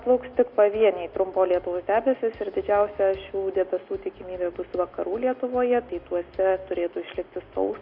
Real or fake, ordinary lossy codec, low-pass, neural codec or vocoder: real; Opus, 64 kbps; 5.4 kHz; none